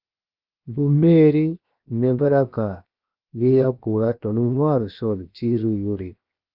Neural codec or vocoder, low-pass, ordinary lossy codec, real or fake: codec, 16 kHz, 0.7 kbps, FocalCodec; 5.4 kHz; Opus, 24 kbps; fake